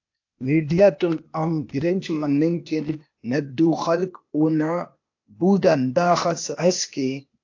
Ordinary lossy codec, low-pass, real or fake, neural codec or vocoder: AAC, 48 kbps; 7.2 kHz; fake; codec, 16 kHz, 0.8 kbps, ZipCodec